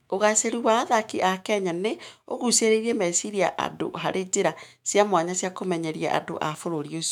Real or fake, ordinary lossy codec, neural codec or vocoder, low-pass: fake; none; autoencoder, 48 kHz, 128 numbers a frame, DAC-VAE, trained on Japanese speech; 19.8 kHz